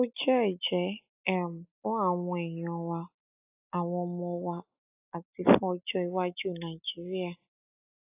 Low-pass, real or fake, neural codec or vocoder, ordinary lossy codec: 3.6 kHz; real; none; AAC, 32 kbps